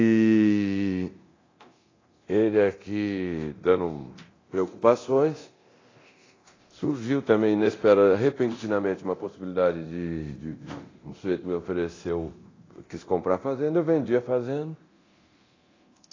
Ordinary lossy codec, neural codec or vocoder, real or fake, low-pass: AAC, 32 kbps; codec, 24 kHz, 0.9 kbps, DualCodec; fake; 7.2 kHz